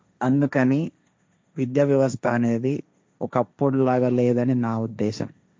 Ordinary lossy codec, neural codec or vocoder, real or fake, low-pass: none; codec, 16 kHz, 1.1 kbps, Voila-Tokenizer; fake; none